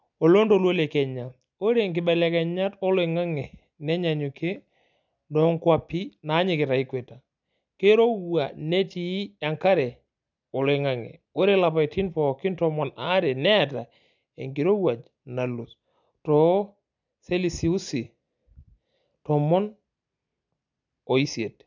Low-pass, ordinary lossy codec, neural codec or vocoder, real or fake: 7.2 kHz; none; none; real